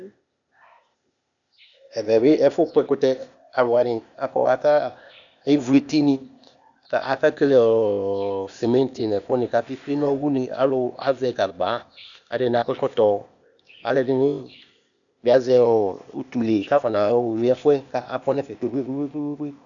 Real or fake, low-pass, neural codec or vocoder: fake; 7.2 kHz; codec, 16 kHz, 0.8 kbps, ZipCodec